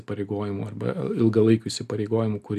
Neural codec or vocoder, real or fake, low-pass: none; real; 14.4 kHz